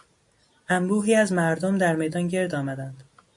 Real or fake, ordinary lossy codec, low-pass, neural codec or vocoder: real; MP3, 48 kbps; 10.8 kHz; none